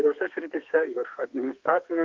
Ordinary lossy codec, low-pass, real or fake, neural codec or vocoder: Opus, 24 kbps; 7.2 kHz; fake; codec, 32 kHz, 1.9 kbps, SNAC